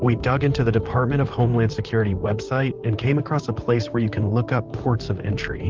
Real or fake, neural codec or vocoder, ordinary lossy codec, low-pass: fake; vocoder, 44.1 kHz, 128 mel bands, Pupu-Vocoder; Opus, 24 kbps; 7.2 kHz